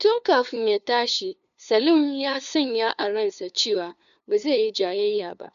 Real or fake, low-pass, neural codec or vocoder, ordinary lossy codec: fake; 7.2 kHz; codec, 16 kHz, 2 kbps, FunCodec, trained on LibriTTS, 25 frames a second; none